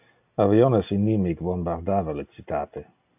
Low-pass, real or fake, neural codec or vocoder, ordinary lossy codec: 3.6 kHz; real; none; AAC, 32 kbps